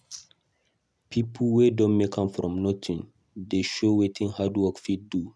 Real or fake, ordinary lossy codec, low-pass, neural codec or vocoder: real; none; none; none